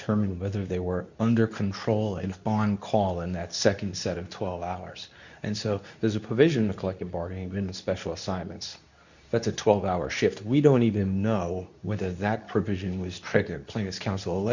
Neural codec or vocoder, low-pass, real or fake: codec, 24 kHz, 0.9 kbps, WavTokenizer, medium speech release version 2; 7.2 kHz; fake